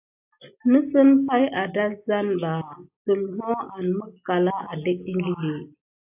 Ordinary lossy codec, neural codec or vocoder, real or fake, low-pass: AAC, 32 kbps; none; real; 3.6 kHz